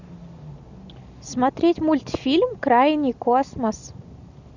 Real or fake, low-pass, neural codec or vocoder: real; 7.2 kHz; none